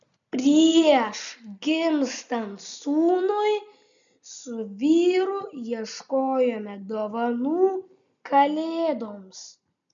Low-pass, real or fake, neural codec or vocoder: 7.2 kHz; real; none